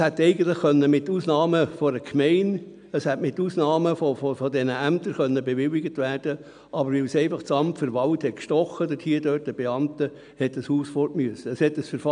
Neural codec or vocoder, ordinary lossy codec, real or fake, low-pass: none; none; real; 9.9 kHz